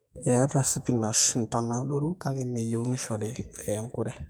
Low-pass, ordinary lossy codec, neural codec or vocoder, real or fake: none; none; codec, 44.1 kHz, 2.6 kbps, SNAC; fake